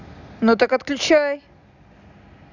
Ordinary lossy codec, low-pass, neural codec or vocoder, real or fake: none; 7.2 kHz; none; real